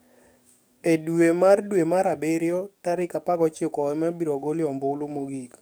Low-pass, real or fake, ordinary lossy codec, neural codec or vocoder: none; fake; none; codec, 44.1 kHz, 7.8 kbps, DAC